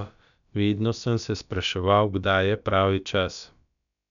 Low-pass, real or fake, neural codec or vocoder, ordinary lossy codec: 7.2 kHz; fake; codec, 16 kHz, about 1 kbps, DyCAST, with the encoder's durations; none